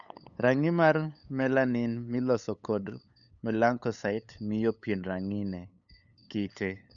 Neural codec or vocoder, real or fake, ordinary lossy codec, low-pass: codec, 16 kHz, 8 kbps, FunCodec, trained on LibriTTS, 25 frames a second; fake; none; 7.2 kHz